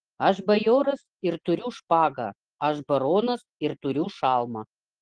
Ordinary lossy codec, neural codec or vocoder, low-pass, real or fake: Opus, 16 kbps; none; 9.9 kHz; real